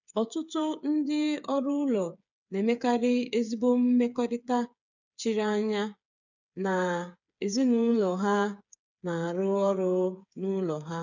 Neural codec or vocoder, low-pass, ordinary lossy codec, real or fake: codec, 16 kHz, 8 kbps, FreqCodec, smaller model; 7.2 kHz; none; fake